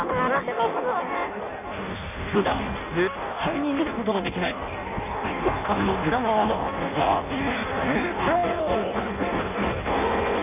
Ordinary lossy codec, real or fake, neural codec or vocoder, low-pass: none; fake; codec, 16 kHz in and 24 kHz out, 0.6 kbps, FireRedTTS-2 codec; 3.6 kHz